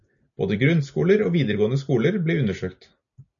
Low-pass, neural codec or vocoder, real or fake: 7.2 kHz; none; real